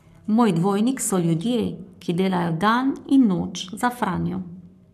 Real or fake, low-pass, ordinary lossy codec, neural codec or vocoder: fake; 14.4 kHz; none; codec, 44.1 kHz, 7.8 kbps, Pupu-Codec